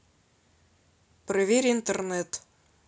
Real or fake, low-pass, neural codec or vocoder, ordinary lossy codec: real; none; none; none